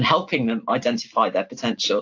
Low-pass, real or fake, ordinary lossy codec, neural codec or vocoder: 7.2 kHz; real; AAC, 48 kbps; none